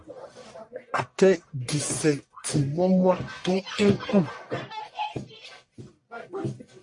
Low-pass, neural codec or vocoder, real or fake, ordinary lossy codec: 10.8 kHz; codec, 44.1 kHz, 1.7 kbps, Pupu-Codec; fake; MP3, 48 kbps